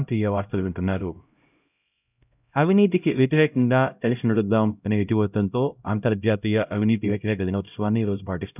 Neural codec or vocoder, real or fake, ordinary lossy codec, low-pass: codec, 16 kHz, 0.5 kbps, X-Codec, HuBERT features, trained on LibriSpeech; fake; none; 3.6 kHz